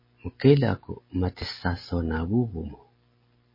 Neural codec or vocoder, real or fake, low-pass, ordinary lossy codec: none; real; 5.4 kHz; MP3, 24 kbps